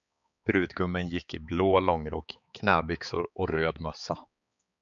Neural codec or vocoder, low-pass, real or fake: codec, 16 kHz, 4 kbps, X-Codec, HuBERT features, trained on balanced general audio; 7.2 kHz; fake